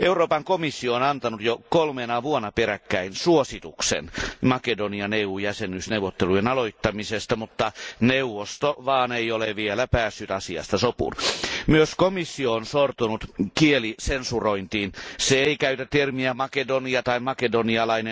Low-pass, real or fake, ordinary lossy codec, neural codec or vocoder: none; real; none; none